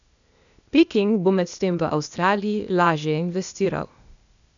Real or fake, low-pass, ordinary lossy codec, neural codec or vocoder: fake; 7.2 kHz; none; codec, 16 kHz, 0.8 kbps, ZipCodec